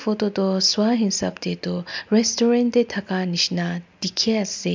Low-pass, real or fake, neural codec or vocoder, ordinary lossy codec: 7.2 kHz; real; none; MP3, 64 kbps